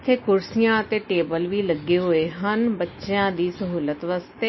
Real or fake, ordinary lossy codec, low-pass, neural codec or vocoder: real; MP3, 24 kbps; 7.2 kHz; none